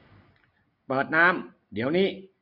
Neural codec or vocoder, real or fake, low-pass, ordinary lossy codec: none; real; 5.4 kHz; none